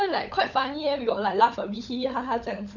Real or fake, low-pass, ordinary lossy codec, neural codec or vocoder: fake; 7.2 kHz; none; codec, 16 kHz, 16 kbps, FunCodec, trained on LibriTTS, 50 frames a second